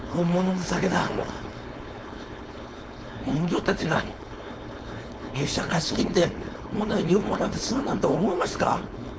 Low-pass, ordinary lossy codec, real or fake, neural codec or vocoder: none; none; fake; codec, 16 kHz, 4.8 kbps, FACodec